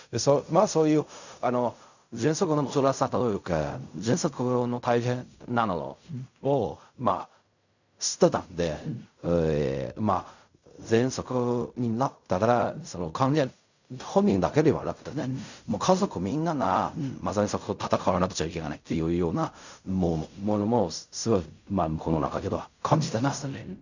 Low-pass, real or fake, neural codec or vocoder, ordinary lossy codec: 7.2 kHz; fake; codec, 16 kHz in and 24 kHz out, 0.4 kbps, LongCat-Audio-Codec, fine tuned four codebook decoder; none